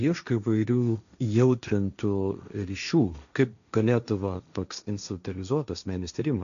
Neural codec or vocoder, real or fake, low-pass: codec, 16 kHz, 1.1 kbps, Voila-Tokenizer; fake; 7.2 kHz